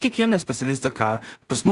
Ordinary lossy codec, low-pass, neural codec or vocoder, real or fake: Opus, 64 kbps; 10.8 kHz; codec, 16 kHz in and 24 kHz out, 0.4 kbps, LongCat-Audio-Codec, two codebook decoder; fake